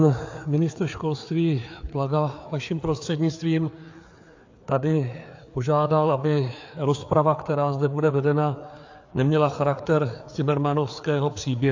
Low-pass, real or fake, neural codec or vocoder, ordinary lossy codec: 7.2 kHz; fake; codec, 16 kHz, 4 kbps, FreqCodec, larger model; AAC, 48 kbps